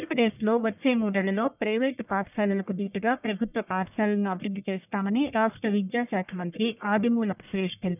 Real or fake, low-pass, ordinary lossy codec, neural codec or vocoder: fake; 3.6 kHz; none; codec, 44.1 kHz, 1.7 kbps, Pupu-Codec